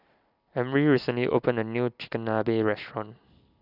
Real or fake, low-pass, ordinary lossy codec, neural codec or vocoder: real; 5.4 kHz; none; none